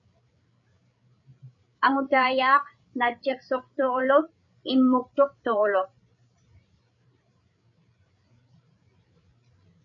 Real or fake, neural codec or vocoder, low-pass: fake; codec, 16 kHz, 8 kbps, FreqCodec, larger model; 7.2 kHz